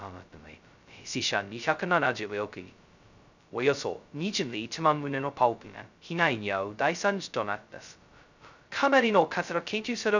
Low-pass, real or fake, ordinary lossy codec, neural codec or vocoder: 7.2 kHz; fake; none; codec, 16 kHz, 0.2 kbps, FocalCodec